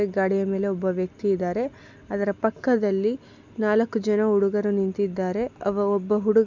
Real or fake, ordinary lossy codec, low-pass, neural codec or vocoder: real; none; 7.2 kHz; none